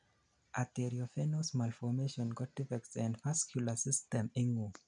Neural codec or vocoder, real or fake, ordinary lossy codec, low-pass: none; real; none; 9.9 kHz